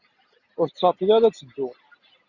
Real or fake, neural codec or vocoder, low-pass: real; none; 7.2 kHz